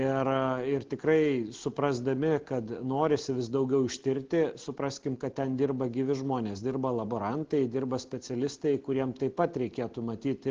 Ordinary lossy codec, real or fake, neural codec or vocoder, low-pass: Opus, 16 kbps; real; none; 7.2 kHz